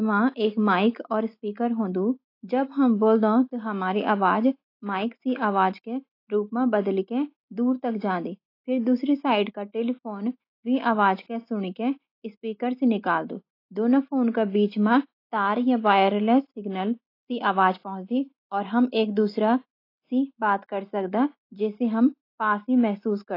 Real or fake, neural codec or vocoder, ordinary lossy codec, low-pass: real; none; AAC, 32 kbps; 5.4 kHz